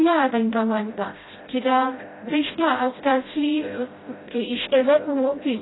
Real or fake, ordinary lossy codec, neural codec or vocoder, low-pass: fake; AAC, 16 kbps; codec, 16 kHz, 0.5 kbps, FreqCodec, smaller model; 7.2 kHz